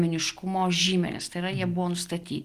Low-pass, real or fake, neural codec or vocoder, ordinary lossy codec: 14.4 kHz; real; none; Opus, 24 kbps